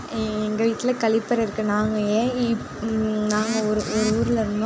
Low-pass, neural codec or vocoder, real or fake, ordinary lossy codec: none; none; real; none